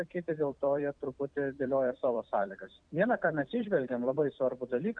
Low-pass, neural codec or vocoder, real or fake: 9.9 kHz; vocoder, 44.1 kHz, 128 mel bands every 256 samples, BigVGAN v2; fake